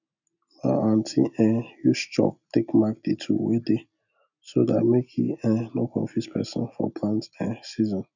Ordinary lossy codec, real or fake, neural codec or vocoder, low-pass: none; real; none; 7.2 kHz